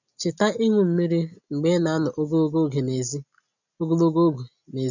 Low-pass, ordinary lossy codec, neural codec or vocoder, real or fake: 7.2 kHz; none; none; real